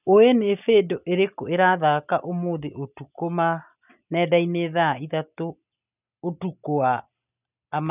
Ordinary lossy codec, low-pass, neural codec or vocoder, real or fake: none; 3.6 kHz; none; real